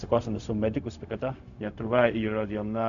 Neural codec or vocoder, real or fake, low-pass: codec, 16 kHz, 0.4 kbps, LongCat-Audio-Codec; fake; 7.2 kHz